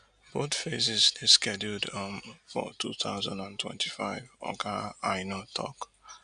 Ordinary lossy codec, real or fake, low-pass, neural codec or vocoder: none; real; 9.9 kHz; none